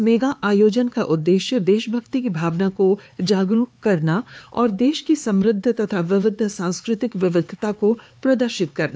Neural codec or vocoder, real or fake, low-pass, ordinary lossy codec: codec, 16 kHz, 4 kbps, X-Codec, HuBERT features, trained on LibriSpeech; fake; none; none